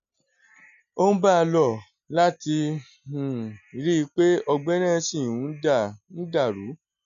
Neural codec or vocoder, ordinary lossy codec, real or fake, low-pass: none; none; real; 7.2 kHz